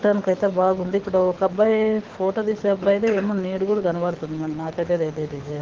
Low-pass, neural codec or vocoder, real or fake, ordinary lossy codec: 7.2 kHz; codec, 24 kHz, 6 kbps, HILCodec; fake; Opus, 16 kbps